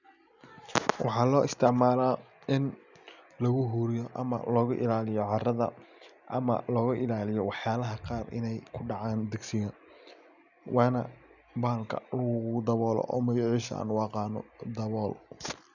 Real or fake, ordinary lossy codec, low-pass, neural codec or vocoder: real; none; 7.2 kHz; none